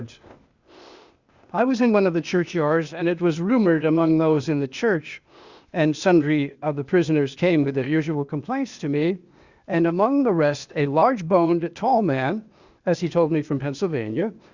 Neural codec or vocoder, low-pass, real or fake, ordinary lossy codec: codec, 16 kHz, 0.8 kbps, ZipCodec; 7.2 kHz; fake; Opus, 64 kbps